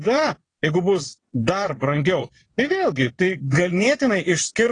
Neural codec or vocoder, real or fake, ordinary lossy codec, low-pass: vocoder, 22.05 kHz, 80 mel bands, WaveNeXt; fake; AAC, 32 kbps; 9.9 kHz